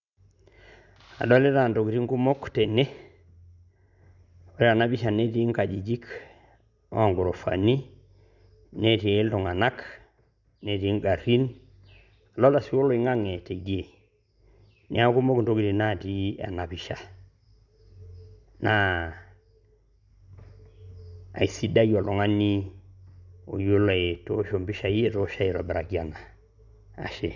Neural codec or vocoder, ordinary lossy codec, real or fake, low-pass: none; none; real; 7.2 kHz